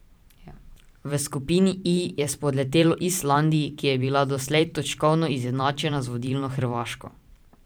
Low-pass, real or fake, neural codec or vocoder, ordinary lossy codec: none; fake; vocoder, 44.1 kHz, 128 mel bands every 512 samples, BigVGAN v2; none